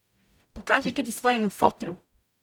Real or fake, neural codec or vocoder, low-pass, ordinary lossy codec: fake; codec, 44.1 kHz, 0.9 kbps, DAC; 19.8 kHz; none